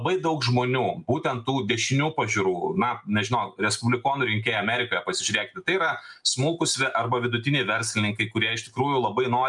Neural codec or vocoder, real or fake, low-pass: none; real; 10.8 kHz